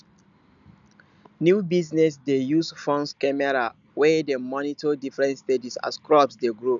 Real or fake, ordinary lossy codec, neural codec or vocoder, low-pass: real; none; none; 7.2 kHz